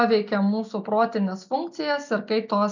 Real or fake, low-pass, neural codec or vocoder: real; 7.2 kHz; none